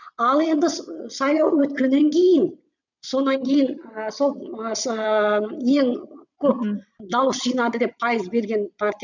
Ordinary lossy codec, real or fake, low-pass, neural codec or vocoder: none; fake; 7.2 kHz; vocoder, 22.05 kHz, 80 mel bands, WaveNeXt